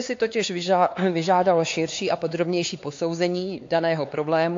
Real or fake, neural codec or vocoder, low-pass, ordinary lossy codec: fake; codec, 16 kHz, 4 kbps, X-Codec, HuBERT features, trained on LibriSpeech; 7.2 kHz; AAC, 48 kbps